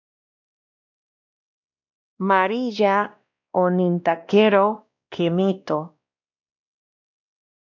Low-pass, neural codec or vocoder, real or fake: 7.2 kHz; codec, 16 kHz, 1 kbps, X-Codec, WavLM features, trained on Multilingual LibriSpeech; fake